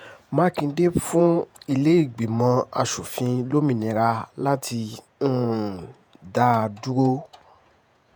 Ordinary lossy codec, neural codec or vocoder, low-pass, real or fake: none; vocoder, 48 kHz, 128 mel bands, Vocos; none; fake